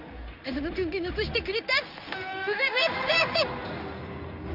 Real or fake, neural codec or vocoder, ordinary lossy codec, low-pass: fake; codec, 16 kHz in and 24 kHz out, 1 kbps, XY-Tokenizer; none; 5.4 kHz